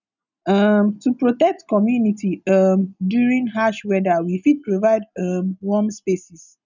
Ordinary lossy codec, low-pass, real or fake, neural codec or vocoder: none; 7.2 kHz; real; none